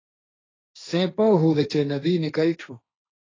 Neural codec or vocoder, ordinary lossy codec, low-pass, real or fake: codec, 16 kHz, 1.1 kbps, Voila-Tokenizer; AAC, 32 kbps; 7.2 kHz; fake